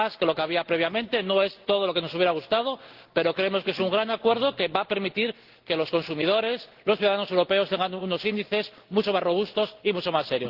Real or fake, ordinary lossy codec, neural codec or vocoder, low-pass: real; Opus, 16 kbps; none; 5.4 kHz